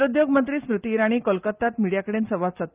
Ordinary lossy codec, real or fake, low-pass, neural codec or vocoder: Opus, 24 kbps; real; 3.6 kHz; none